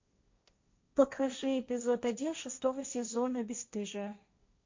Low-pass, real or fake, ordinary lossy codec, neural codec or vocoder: 7.2 kHz; fake; MP3, 48 kbps; codec, 16 kHz, 1.1 kbps, Voila-Tokenizer